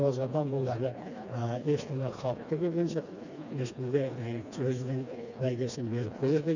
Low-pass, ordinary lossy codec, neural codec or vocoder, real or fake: 7.2 kHz; none; codec, 16 kHz, 2 kbps, FreqCodec, smaller model; fake